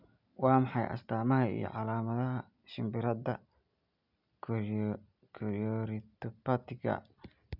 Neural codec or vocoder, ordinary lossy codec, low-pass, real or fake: none; none; 5.4 kHz; real